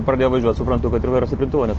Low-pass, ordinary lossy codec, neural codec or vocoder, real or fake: 7.2 kHz; Opus, 16 kbps; none; real